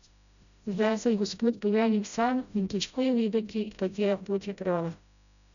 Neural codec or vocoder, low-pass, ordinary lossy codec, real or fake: codec, 16 kHz, 0.5 kbps, FreqCodec, smaller model; 7.2 kHz; none; fake